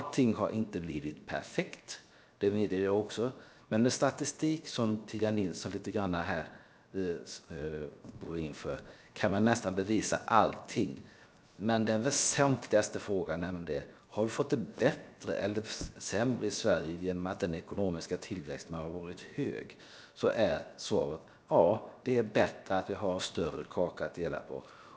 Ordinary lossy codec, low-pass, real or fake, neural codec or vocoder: none; none; fake; codec, 16 kHz, 0.7 kbps, FocalCodec